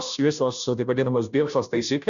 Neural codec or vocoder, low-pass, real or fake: codec, 16 kHz, 0.5 kbps, FunCodec, trained on Chinese and English, 25 frames a second; 7.2 kHz; fake